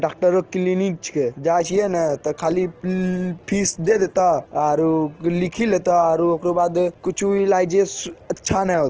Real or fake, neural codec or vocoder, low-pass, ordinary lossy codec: real; none; 7.2 kHz; Opus, 16 kbps